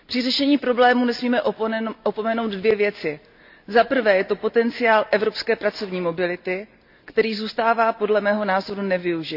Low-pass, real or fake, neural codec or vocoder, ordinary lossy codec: 5.4 kHz; real; none; none